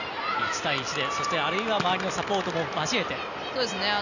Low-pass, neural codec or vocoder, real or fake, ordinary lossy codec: 7.2 kHz; none; real; none